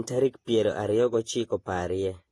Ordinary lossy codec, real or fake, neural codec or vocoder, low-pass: AAC, 32 kbps; fake; vocoder, 48 kHz, 128 mel bands, Vocos; 19.8 kHz